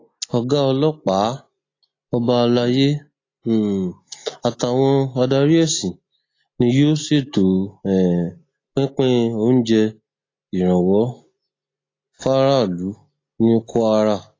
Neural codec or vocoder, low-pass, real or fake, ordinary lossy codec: none; 7.2 kHz; real; AAC, 32 kbps